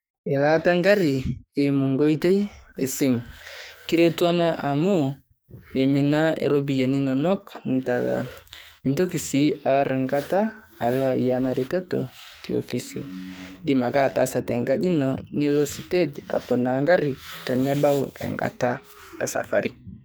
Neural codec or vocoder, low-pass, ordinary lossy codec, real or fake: codec, 44.1 kHz, 2.6 kbps, SNAC; none; none; fake